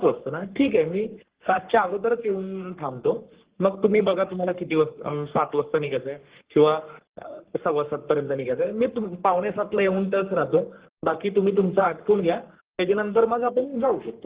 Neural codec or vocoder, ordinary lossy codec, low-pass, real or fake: codec, 44.1 kHz, 3.4 kbps, Pupu-Codec; Opus, 16 kbps; 3.6 kHz; fake